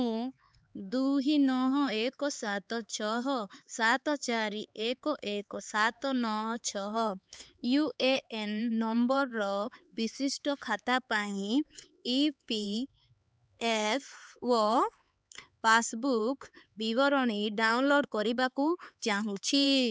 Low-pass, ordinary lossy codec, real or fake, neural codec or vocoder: none; none; fake; codec, 16 kHz, 4 kbps, X-Codec, HuBERT features, trained on LibriSpeech